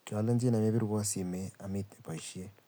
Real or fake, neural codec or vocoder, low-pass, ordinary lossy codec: real; none; none; none